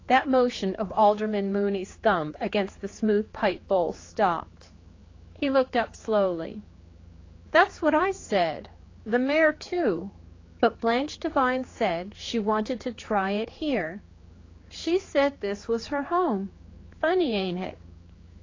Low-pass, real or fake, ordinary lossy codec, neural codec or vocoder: 7.2 kHz; fake; AAC, 32 kbps; codec, 16 kHz, 4 kbps, X-Codec, HuBERT features, trained on general audio